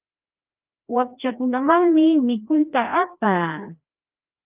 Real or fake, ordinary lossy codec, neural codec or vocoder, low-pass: fake; Opus, 24 kbps; codec, 16 kHz, 1 kbps, FreqCodec, larger model; 3.6 kHz